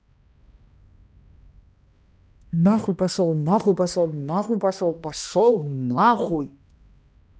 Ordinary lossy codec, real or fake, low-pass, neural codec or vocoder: none; fake; none; codec, 16 kHz, 1 kbps, X-Codec, HuBERT features, trained on balanced general audio